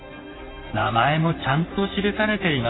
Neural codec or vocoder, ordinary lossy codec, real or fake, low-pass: vocoder, 44.1 kHz, 128 mel bands, Pupu-Vocoder; AAC, 16 kbps; fake; 7.2 kHz